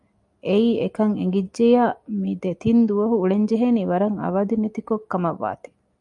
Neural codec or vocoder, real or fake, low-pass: none; real; 10.8 kHz